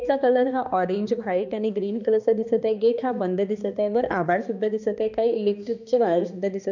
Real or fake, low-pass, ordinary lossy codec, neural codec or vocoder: fake; 7.2 kHz; none; codec, 16 kHz, 2 kbps, X-Codec, HuBERT features, trained on balanced general audio